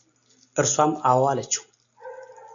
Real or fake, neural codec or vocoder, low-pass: real; none; 7.2 kHz